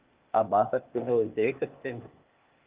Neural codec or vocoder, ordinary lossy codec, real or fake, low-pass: codec, 16 kHz, 0.8 kbps, ZipCodec; Opus, 32 kbps; fake; 3.6 kHz